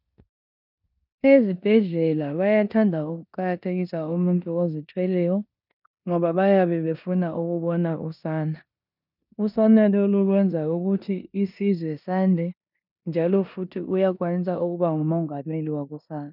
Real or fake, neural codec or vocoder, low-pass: fake; codec, 16 kHz in and 24 kHz out, 0.9 kbps, LongCat-Audio-Codec, four codebook decoder; 5.4 kHz